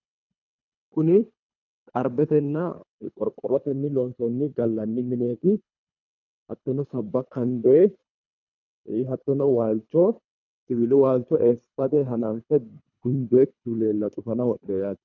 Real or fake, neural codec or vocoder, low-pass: fake; codec, 24 kHz, 3 kbps, HILCodec; 7.2 kHz